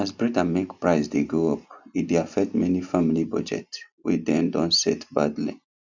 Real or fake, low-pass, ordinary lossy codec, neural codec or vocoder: real; 7.2 kHz; none; none